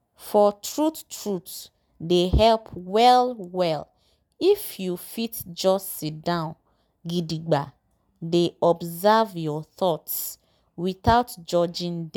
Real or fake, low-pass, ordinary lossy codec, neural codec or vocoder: real; none; none; none